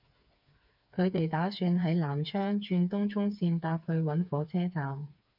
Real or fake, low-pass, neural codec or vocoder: fake; 5.4 kHz; codec, 16 kHz, 4 kbps, FreqCodec, smaller model